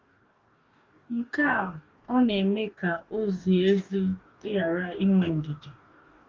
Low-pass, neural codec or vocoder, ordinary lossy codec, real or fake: 7.2 kHz; codec, 44.1 kHz, 2.6 kbps, DAC; Opus, 32 kbps; fake